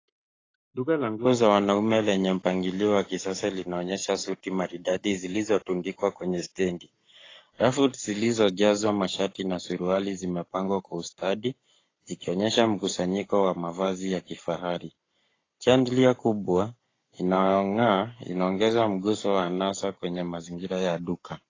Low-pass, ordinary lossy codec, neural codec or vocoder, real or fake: 7.2 kHz; AAC, 32 kbps; codec, 44.1 kHz, 7.8 kbps, Pupu-Codec; fake